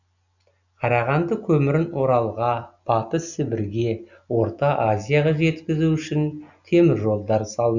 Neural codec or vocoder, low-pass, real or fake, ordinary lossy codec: none; 7.2 kHz; real; none